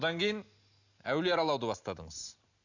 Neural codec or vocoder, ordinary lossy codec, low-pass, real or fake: none; none; 7.2 kHz; real